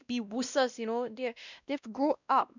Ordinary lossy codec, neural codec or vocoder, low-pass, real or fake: none; codec, 16 kHz, 1 kbps, X-Codec, WavLM features, trained on Multilingual LibriSpeech; 7.2 kHz; fake